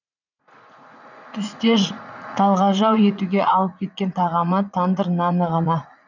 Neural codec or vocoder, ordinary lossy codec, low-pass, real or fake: vocoder, 44.1 kHz, 80 mel bands, Vocos; none; 7.2 kHz; fake